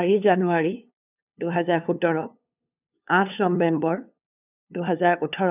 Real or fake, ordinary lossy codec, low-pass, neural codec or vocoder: fake; none; 3.6 kHz; codec, 16 kHz, 2 kbps, FunCodec, trained on LibriTTS, 25 frames a second